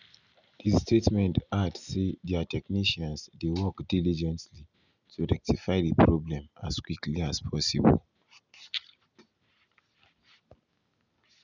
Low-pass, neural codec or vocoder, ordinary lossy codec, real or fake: 7.2 kHz; none; none; real